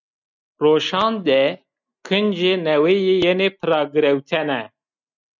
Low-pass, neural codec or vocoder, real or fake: 7.2 kHz; none; real